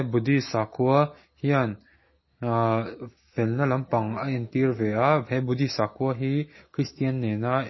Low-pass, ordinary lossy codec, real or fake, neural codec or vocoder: 7.2 kHz; MP3, 24 kbps; real; none